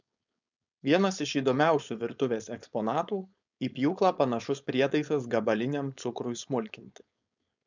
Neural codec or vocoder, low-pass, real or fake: codec, 16 kHz, 4.8 kbps, FACodec; 7.2 kHz; fake